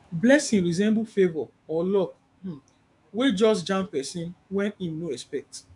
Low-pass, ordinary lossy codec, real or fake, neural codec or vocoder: 10.8 kHz; MP3, 96 kbps; fake; autoencoder, 48 kHz, 128 numbers a frame, DAC-VAE, trained on Japanese speech